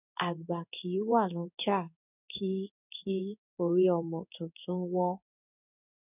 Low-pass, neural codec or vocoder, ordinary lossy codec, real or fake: 3.6 kHz; codec, 16 kHz in and 24 kHz out, 1 kbps, XY-Tokenizer; none; fake